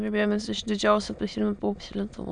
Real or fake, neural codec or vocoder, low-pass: fake; autoencoder, 22.05 kHz, a latent of 192 numbers a frame, VITS, trained on many speakers; 9.9 kHz